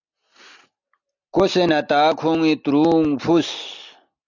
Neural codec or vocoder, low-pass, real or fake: none; 7.2 kHz; real